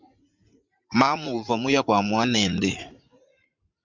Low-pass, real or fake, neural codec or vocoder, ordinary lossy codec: 7.2 kHz; fake; vocoder, 44.1 kHz, 80 mel bands, Vocos; Opus, 64 kbps